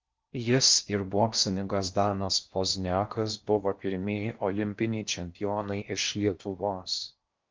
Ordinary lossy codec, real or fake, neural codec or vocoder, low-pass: Opus, 24 kbps; fake; codec, 16 kHz in and 24 kHz out, 0.6 kbps, FocalCodec, streaming, 4096 codes; 7.2 kHz